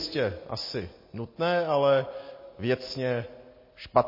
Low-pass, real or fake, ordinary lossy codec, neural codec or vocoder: 5.4 kHz; real; MP3, 24 kbps; none